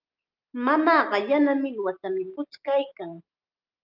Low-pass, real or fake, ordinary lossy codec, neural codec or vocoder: 5.4 kHz; real; Opus, 24 kbps; none